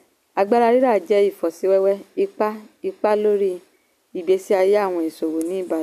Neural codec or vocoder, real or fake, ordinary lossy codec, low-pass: none; real; none; 14.4 kHz